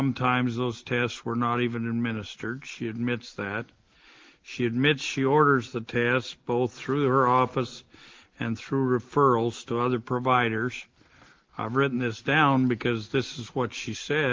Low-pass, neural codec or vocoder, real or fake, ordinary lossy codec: 7.2 kHz; none; real; Opus, 16 kbps